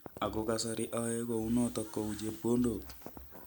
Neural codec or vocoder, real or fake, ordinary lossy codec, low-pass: none; real; none; none